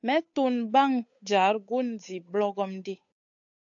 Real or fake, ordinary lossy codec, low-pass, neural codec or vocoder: fake; AAC, 64 kbps; 7.2 kHz; codec, 16 kHz, 8 kbps, FunCodec, trained on Chinese and English, 25 frames a second